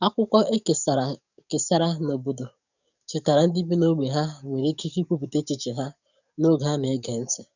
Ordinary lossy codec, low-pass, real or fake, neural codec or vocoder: none; 7.2 kHz; fake; codec, 44.1 kHz, 7.8 kbps, DAC